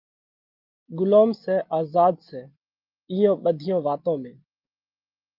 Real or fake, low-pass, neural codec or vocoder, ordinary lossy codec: real; 5.4 kHz; none; Opus, 32 kbps